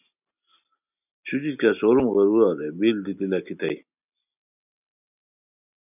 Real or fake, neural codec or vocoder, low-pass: real; none; 3.6 kHz